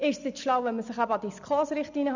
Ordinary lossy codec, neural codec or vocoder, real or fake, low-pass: none; none; real; 7.2 kHz